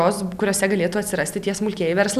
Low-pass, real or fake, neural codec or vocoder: 14.4 kHz; real; none